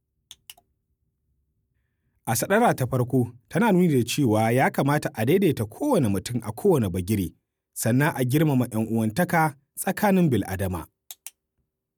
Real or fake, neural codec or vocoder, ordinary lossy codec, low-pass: real; none; none; none